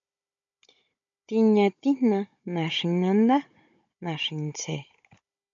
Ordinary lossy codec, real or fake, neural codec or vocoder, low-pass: MP3, 48 kbps; fake; codec, 16 kHz, 16 kbps, FunCodec, trained on Chinese and English, 50 frames a second; 7.2 kHz